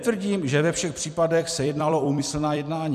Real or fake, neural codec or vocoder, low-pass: fake; vocoder, 44.1 kHz, 128 mel bands every 256 samples, BigVGAN v2; 14.4 kHz